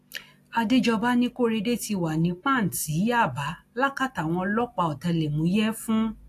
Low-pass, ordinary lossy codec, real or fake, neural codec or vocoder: 14.4 kHz; AAC, 64 kbps; real; none